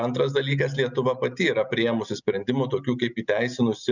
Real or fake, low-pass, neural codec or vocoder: real; 7.2 kHz; none